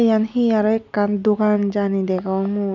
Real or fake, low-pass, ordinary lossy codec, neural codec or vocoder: real; 7.2 kHz; none; none